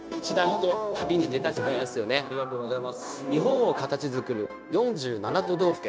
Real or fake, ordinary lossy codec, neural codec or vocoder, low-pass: fake; none; codec, 16 kHz, 0.9 kbps, LongCat-Audio-Codec; none